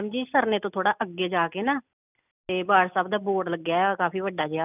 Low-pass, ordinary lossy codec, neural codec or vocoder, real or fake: 3.6 kHz; none; none; real